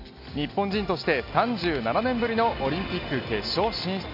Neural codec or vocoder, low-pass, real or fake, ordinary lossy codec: none; 5.4 kHz; real; none